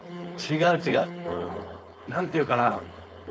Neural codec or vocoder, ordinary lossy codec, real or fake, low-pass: codec, 16 kHz, 4.8 kbps, FACodec; none; fake; none